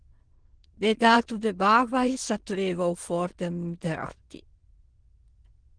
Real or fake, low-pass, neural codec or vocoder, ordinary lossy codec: fake; 9.9 kHz; autoencoder, 22.05 kHz, a latent of 192 numbers a frame, VITS, trained on many speakers; Opus, 16 kbps